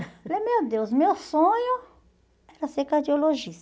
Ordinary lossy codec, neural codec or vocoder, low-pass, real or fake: none; none; none; real